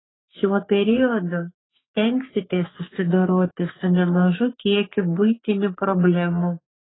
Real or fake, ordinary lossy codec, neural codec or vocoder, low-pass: fake; AAC, 16 kbps; codec, 44.1 kHz, 3.4 kbps, Pupu-Codec; 7.2 kHz